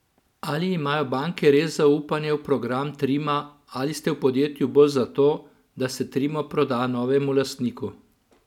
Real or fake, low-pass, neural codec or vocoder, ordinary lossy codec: real; 19.8 kHz; none; none